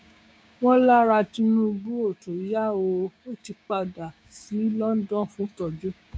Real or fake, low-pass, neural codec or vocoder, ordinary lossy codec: fake; none; codec, 16 kHz, 6 kbps, DAC; none